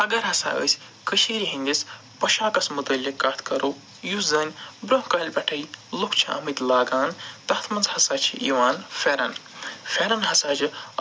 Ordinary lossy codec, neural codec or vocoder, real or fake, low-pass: none; none; real; none